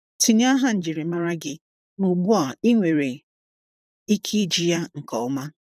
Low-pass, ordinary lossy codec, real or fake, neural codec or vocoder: 14.4 kHz; none; fake; vocoder, 44.1 kHz, 128 mel bands, Pupu-Vocoder